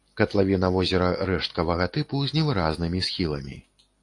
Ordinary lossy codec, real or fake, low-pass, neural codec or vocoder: AAC, 48 kbps; real; 10.8 kHz; none